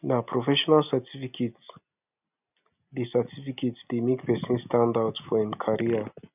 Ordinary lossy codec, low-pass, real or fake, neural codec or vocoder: none; 3.6 kHz; real; none